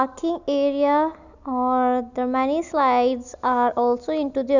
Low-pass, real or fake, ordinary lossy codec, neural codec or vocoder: 7.2 kHz; real; none; none